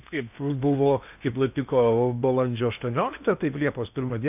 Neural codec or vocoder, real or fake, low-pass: codec, 16 kHz in and 24 kHz out, 0.8 kbps, FocalCodec, streaming, 65536 codes; fake; 3.6 kHz